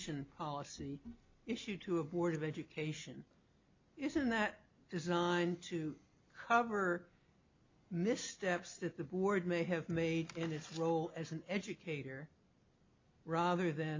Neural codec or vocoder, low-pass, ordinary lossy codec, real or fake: none; 7.2 kHz; MP3, 48 kbps; real